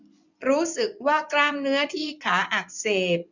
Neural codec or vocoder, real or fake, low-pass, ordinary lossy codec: vocoder, 24 kHz, 100 mel bands, Vocos; fake; 7.2 kHz; none